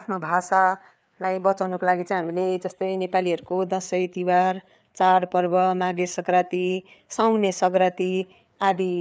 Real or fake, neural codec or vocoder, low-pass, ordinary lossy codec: fake; codec, 16 kHz, 4 kbps, FreqCodec, larger model; none; none